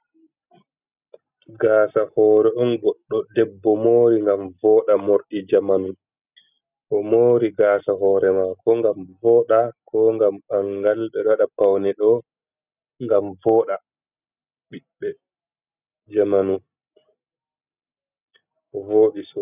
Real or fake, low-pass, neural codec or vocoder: real; 3.6 kHz; none